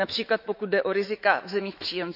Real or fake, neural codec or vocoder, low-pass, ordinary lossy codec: fake; autoencoder, 48 kHz, 128 numbers a frame, DAC-VAE, trained on Japanese speech; 5.4 kHz; none